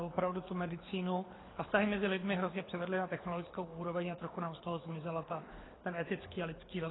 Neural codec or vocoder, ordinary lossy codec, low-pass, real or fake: codec, 24 kHz, 6 kbps, HILCodec; AAC, 16 kbps; 7.2 kHz; fake